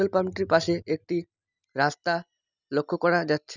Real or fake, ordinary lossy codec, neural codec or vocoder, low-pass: real; none; none; 7.2 kHz